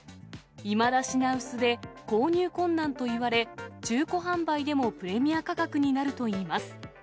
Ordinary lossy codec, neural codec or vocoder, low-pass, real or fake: none; none; none; real